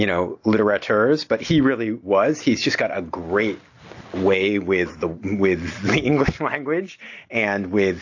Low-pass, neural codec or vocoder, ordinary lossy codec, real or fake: 7.2 kHz; none; AAC, 48 kbps; real